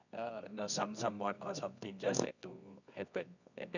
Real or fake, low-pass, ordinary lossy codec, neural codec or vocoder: fake; 7.2 kHz; none; codec, 24 kHz, 0.9 kbps, WavTokenizer, medium music audio release